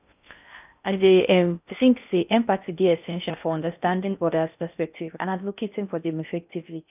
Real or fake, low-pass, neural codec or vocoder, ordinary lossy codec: fake; 3.6 kHz; codec, 16 kHz in and 24 kHz out, 0.6 kbps, FocalCodec, streaming, 4096 codes; none